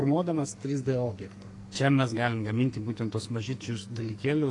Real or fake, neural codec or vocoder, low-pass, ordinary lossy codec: fake; codec, 44.1 kHz, 2.6 kbps, SNAC; 10.8 kHz; AAC, 48 kbps